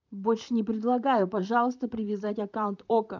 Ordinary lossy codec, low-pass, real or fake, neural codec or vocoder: MP3, 64 kbps; 7.2 kHz; fake; vocoder, 44.1 kHz, 128 mel bands, Pupu-Vocoder